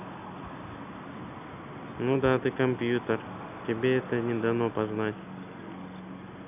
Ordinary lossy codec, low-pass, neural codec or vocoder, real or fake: none; 3.6 kHz; none; real